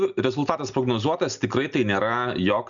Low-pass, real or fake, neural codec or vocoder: 7.2 kHz; real; none